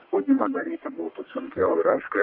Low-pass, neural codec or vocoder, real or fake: 5.4 kHz; codec, 44.1 kHz, 1.7 kbps, Pupu-Codec; fake